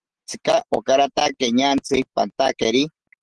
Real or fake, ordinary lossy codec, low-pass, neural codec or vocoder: real; Opus, 16 kbps; 10.8 kHz; none